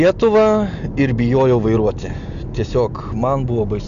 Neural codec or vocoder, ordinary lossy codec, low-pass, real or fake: none; MP3, 96 kbps; 7.2 kHz; real